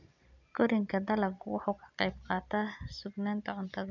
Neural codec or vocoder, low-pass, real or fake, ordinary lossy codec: none; 7.2 kHz; real; none